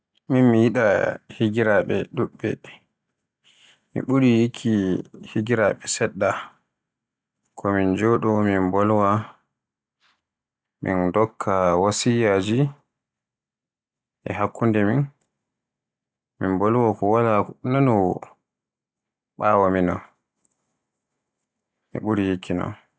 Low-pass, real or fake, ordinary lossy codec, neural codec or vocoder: none; real; none; none